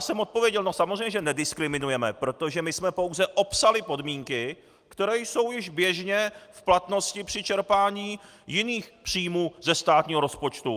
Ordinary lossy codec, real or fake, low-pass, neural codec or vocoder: Opus, 32 kbps; real; 14.4 kHz; none